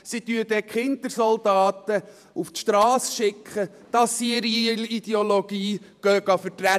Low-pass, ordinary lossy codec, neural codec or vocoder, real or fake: 14.4 kHz; none; vocoder, 48 kHz, 128 mel bands, Vocos; fake